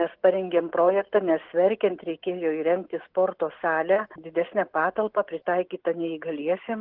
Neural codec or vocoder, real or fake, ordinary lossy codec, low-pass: none; real; Opus, 16 kbps; 5.4 kHz